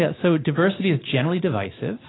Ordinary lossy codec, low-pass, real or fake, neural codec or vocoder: AAC, 16 kbps; 7.2 kHz; real; none